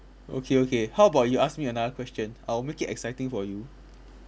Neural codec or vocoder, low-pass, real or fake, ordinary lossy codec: none; none; real; none